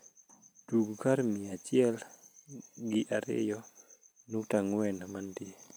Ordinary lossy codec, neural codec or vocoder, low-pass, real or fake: none; none; none; real